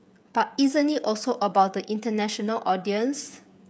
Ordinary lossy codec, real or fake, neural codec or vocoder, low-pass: none; fake; codec, 16 kHz, 16 kbps, FreqCodec, smaller model; none